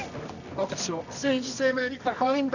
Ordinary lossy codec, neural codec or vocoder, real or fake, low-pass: none; codec, 24 kHz, 0.9 kbps, WavTokenizer, medium music audio release; fake; 7.2 kHz